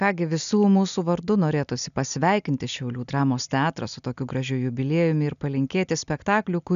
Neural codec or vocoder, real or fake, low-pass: none; real; 7.2 kHz